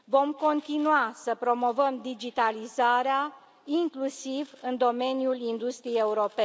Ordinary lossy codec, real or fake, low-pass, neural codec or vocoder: none; real; none; none